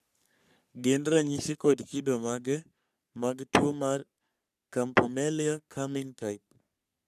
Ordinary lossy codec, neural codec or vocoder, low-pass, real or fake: none; codec, 44.1 kHz, 3.4 kbps, Pupu-Codec; 14.4 kHz; fake